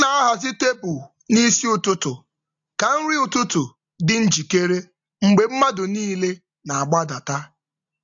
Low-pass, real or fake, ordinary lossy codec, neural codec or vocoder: 7.2 kHz; real; AAC, 64 kbps; none